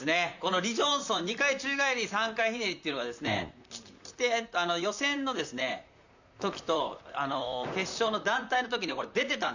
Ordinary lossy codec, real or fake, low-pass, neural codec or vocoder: none; fake; 7.2 kHz; vocoder, 44.1 kHz, 128 mel bands, Pupu-Vocoder